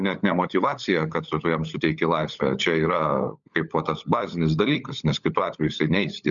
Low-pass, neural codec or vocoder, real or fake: 7.2 kHz; codec, 16 kHz, 16 kbps, FunCodec, trained on LibriTTS, 50 frames a second; fake